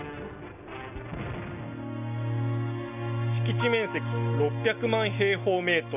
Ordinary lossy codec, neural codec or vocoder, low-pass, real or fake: none; none; 3.6 kHz; real